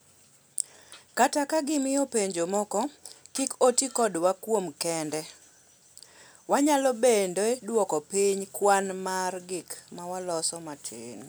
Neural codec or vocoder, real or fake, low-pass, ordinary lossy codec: none; real; none; none